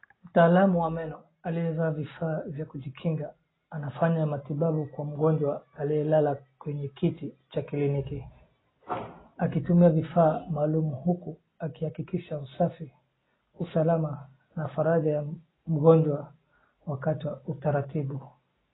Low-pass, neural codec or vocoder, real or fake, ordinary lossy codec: 7.2 kHz; none; real; AAC, 16 kbps